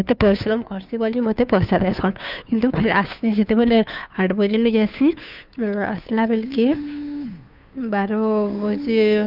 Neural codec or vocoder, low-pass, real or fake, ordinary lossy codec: codec, 16 kHz, 2 kbps, FunCodec, trained on Chinese and English, 25 frames a second; 5.4 kHz; fake; none